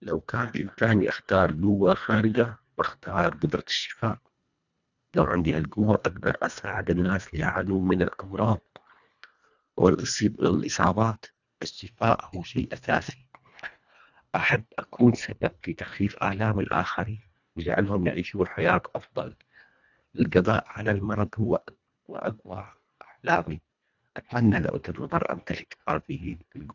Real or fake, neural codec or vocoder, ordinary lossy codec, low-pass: fake; codec, 24 kHz, 1.5 kbps, HILCodec; none; 7.2 kHz